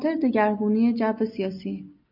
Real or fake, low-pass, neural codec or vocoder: real; 5.4 kHz; none